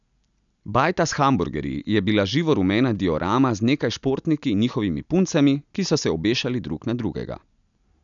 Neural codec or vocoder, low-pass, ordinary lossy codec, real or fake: none; 7.2 kHz; none; real